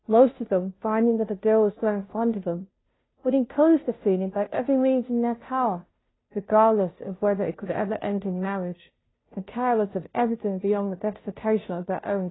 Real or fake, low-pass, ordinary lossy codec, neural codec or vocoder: fake; 7.2 kHz; AAC, 16 kbps; codec, 16 kHz, 0.5 kbps, FunCodec, trained on Chinese and English, 25 frames a second